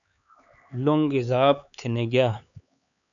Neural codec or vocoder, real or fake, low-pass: codec, 16 kHz, 4 kbps, X-Codec, HuBERT features, trained on LibriSpeech; fake; 7.2 kHz